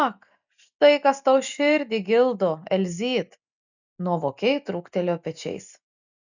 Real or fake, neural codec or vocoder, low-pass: real; none; 7.2 kHz